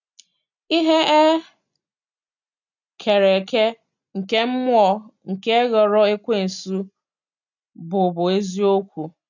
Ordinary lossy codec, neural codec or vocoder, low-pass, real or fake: none; none; 7.2 kHz; real